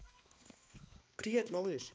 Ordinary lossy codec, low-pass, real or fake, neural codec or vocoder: none; none; fake; codec, 16 kHz, 2 kbps, X-Codec, HuBERT features, trained on balanced general audio